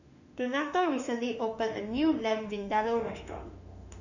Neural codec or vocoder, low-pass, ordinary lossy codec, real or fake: autoencoder, 48 kHz, 32 numbers a frame, DAC-VAE, trained on Japanese speech; 7.2 kHz; none; fake